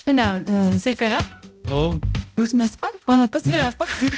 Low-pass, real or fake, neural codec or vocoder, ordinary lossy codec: none; fake; codec, 16 kHz, 0.5 kbps, X-Codec, HuBERT features, trained on balanced general audio; none